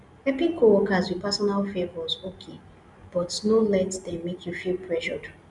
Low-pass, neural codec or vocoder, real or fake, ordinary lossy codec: 10.8 kHz; none; real; none